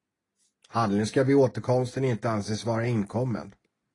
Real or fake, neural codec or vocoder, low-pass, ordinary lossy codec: real; none; 10.8 kHz; AAC, 32 kbps